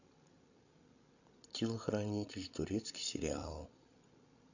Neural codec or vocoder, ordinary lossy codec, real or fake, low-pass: codec, 16 kHz, 16 kbps, FreqCodec, larger model; none; fake; 7.2 kHz